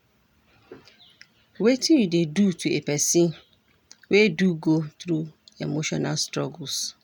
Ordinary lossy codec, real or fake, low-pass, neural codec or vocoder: none; real; 19.8 kHz; none